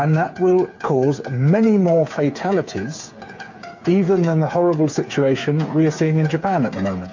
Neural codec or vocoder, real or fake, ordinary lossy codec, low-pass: codec, 16 kHz, 8 kbps, FreqCodec, smaller model; fake; MP3, 48 kbps; 7.2 kHz